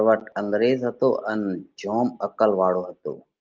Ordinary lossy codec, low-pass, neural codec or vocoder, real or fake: Opus, 32 kbps; 7.2 kHz; none; real